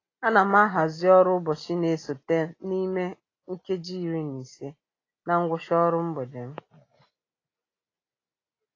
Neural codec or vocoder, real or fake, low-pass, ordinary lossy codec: none; real; 7.2 kHz; AAC, 32 kbps